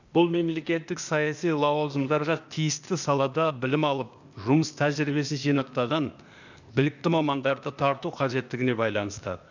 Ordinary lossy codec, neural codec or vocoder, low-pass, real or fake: none; codec, 16 kHz, 0.8 kbps, ZipCodec; 7.2 kHz; fake